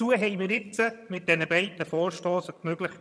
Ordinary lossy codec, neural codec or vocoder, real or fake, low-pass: none; vocoder, 22.05 kHz, 80 mel bands, HiFi-GAN; fake; none